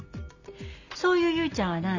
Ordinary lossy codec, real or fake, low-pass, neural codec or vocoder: none; real; 7.2 kHz; none